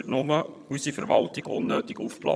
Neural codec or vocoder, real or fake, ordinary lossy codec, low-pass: vocoder, 22.05 kHz, 80 mel bands, HiFi-GAN; fake; none; none